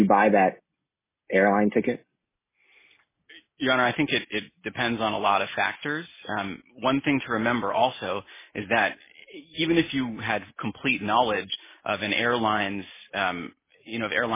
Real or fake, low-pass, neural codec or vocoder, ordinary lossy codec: real; 3.6 kHz; none; MP3, 16 kbps